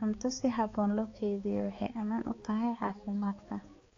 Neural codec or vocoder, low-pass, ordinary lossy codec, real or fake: codec, 16 kHz, 4 kbps, X-Codec, HuBERT features, trained on balanced general audio; 7.2 kHz; AAC, 32 kbps; fake